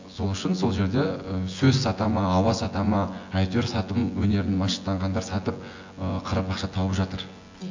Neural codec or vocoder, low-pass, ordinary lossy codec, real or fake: vocoder, 24 kHz, 100 mel bands, Vocos; 7.2 kHz; AAC, 48 kbps; fake